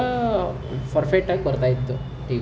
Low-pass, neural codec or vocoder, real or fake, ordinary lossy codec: none; none; real; none